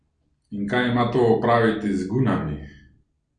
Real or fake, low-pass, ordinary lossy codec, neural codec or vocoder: real; 9.9 kHz; none; none